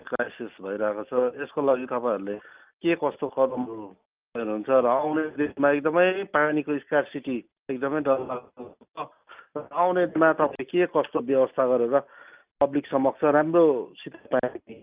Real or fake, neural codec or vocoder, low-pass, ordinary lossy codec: real; none; 3.6 kHz; Opus, 24 kbps